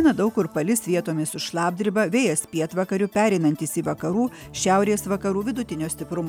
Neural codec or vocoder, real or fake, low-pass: none; real; 19.8 kHz